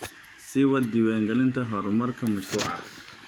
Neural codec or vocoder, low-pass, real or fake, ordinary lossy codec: codec, 44.1 kHz, 7.8 kbps, DAC; none; fake; none